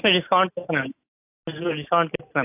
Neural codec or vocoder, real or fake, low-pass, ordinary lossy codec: none; real; 3.6 kHz; AAC, 32 kbps